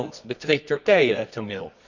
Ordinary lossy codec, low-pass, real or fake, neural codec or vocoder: none; 7.2 kHz; fake; codec, 24 kHz, 0.9 kbps, WavTokenizer, medium music audio release